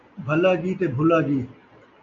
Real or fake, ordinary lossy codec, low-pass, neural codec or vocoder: real; MP3, 64 kbps; 7.2 kHz; none